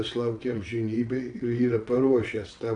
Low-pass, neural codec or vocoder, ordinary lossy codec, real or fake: 9.9 kHz; vocoder, 22.05 kHz, 80 mel bands, WaveNeXt; AAC, 48 kbps; fake